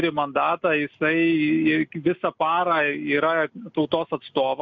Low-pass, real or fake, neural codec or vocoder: 7.2 kHz; real; none